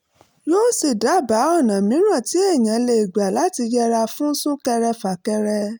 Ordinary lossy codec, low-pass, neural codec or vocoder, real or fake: none; none; none; real